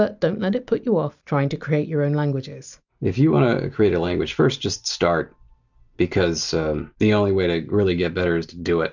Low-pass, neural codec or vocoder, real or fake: 7.2 kHz; none; real